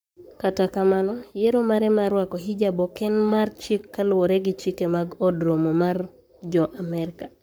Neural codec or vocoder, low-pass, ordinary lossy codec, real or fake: codec, 44.1 kHz, 7.8 kbps, Pupu-Codec; none; none; fake